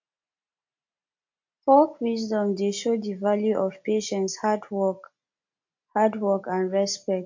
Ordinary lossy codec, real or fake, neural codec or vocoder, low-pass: MP3, 48 kbps; real; none; 7.2 kHz